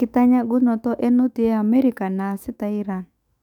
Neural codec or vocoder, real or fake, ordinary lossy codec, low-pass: autoencoder, 48 kHz, 128 numbers a frame, DAC-VAE, trained on Japanese speech; fake; none; 19.8 kHz